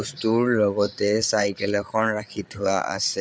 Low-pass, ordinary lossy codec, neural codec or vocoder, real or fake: none; none; codec, 16 kHz, 8 kbps, FreqCodec, larger model; fake